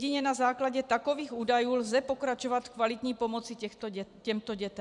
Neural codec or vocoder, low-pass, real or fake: vocoder, 44.1 kHz, 128 mel bands every 256 samples, BigVGAN v2; 10.8 kHz; fake